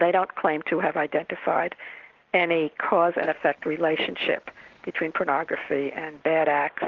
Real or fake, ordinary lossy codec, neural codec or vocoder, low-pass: real; Opus, 16 kbps; none; 7.2 kHz